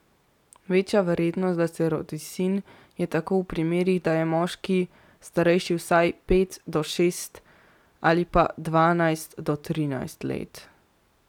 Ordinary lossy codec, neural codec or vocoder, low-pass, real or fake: none; none; 19.8 kHz; real